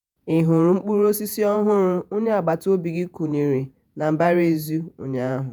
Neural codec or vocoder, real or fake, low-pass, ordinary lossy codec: vocoder, 48 kHz, 128 mel bands, Vocos; fake; none; none